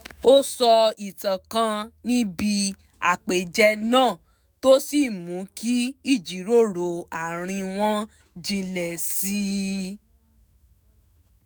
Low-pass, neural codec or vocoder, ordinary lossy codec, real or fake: none; autoencoder, 48 kHz, 128 numbers a frame, DAC-VAE, trained on Japanese speech; none; fake